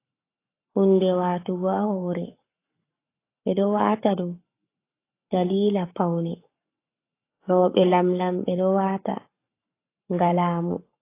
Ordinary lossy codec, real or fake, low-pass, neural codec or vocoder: AAC, 24 kbps; fake; 3.6 kHz; codec, 44.1 kHz, 7.8 kbps, Pupu-Codec